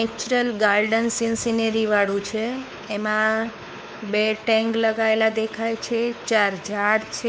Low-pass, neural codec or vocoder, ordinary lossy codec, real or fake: none; codec, 16 kHz, 4 kbps, X-Codec, WavLM features, trained on Multilingual LibriSpeech; none; fake